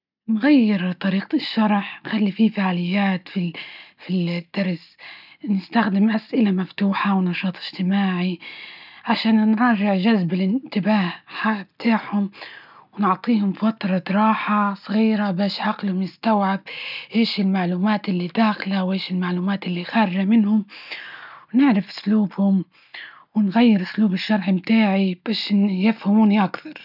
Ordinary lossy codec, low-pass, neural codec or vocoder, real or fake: none; 5.4 kHz; none; real